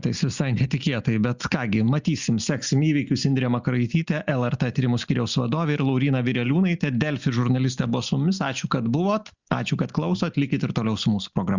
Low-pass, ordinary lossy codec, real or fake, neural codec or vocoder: 7.2 kHz; Opus, 64 kbps; real; none